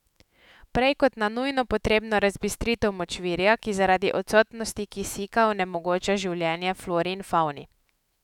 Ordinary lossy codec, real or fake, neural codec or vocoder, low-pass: none; fake; autoencoder, 48 kHz, 128 numbers a frame, DAC-VAE, trained on Japanese speech; 19.8 kHz